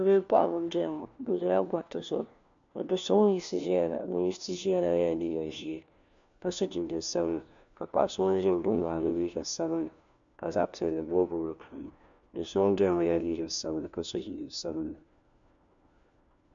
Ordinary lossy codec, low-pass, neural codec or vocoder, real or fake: MP3, 64 kbps; 7.2 kHz; codec, 16 kHz, 1 kbps, FunCodec, trained on LibriTTS, 50 frames a second; fake